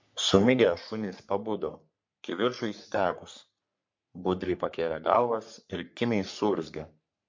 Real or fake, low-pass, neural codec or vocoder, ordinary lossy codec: fake; 7.2 kHz; codec, 44.1 kHz, 3.4 kbps, Pupu-Codec; MP3, 48 kbps